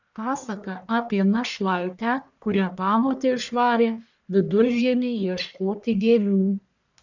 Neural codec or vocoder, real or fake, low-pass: codec, 44.1 kHz, 1.7 kbps, Pupu-Codec; fake; 7.2 kHz